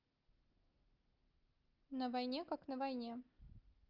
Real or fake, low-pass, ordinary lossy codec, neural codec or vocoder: real; 5.4 kHz; Opus, 32 kbps; none